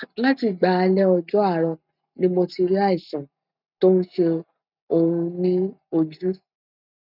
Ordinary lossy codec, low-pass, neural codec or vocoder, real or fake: none; 5.4 kHz; none; real